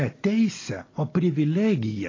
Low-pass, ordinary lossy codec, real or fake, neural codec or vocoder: 7.2 kHz; AAC, 32 kbps; real; none